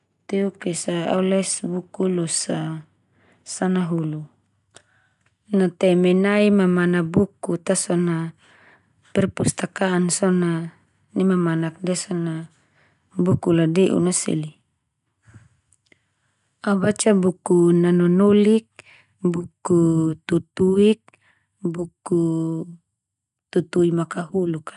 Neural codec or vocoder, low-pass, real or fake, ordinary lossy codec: none; 10.8 kHz; real; none